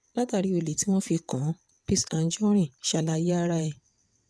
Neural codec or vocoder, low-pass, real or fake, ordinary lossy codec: vocoder, 22.05 kHz, 80 mel bands, WaveNeXt; none; fake; none